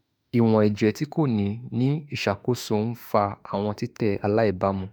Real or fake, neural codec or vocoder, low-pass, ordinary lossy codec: fake; autoencoder, 48 kHz, 32 numbers a frame, DAC-VAE, trained on Japanese speech; none; none